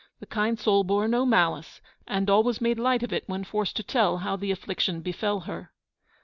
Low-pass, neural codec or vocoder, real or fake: 7.2 kHz; none; real